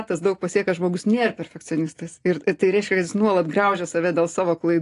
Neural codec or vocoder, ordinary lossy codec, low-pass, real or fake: none; AAC, 48 kbps; 10.8 kHz; real